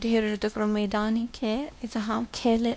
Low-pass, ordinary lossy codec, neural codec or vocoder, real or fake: none; none; codec, 16 kHz, 1 kbps, X-Codec, WavLM features, trained on Multilingual LibriSpeech; fake